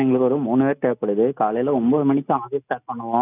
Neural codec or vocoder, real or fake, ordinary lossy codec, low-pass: none; real; none; 3.6 kHz